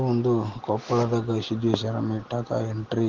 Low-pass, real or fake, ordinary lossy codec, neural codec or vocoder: 7.2 kHz; real; Opus, 16 kbps; none